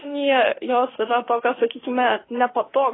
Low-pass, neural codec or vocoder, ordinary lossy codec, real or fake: 7.2 kHz; autoencoder, 48 kHz, 32 numbers a frame, DAC-VAE, trained on Japanese speech; AAC, 16 kbps; fake